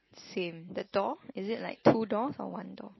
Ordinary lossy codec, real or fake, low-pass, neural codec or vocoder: MP3, 24 kbps; real; 7.2 kHz; none